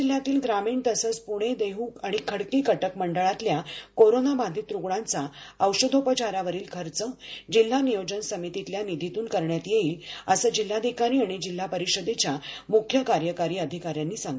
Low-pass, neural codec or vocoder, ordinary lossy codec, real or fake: none; none; none; real